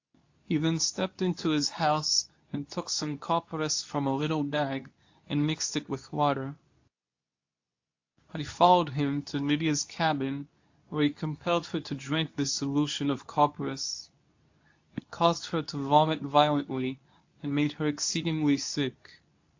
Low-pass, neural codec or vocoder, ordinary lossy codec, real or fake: 7.2 kHz; codec, 24 kHz, 0.9 kbps, WavTokenizer, medium speech release version 2; AAC, 48 kbps; fake